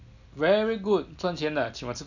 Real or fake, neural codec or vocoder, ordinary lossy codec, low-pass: real; none; none; 7.2 kHz